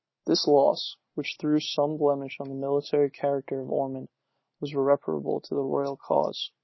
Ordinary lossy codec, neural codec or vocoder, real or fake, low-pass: MP3, 24 kbps; none; real; 7.2 kHz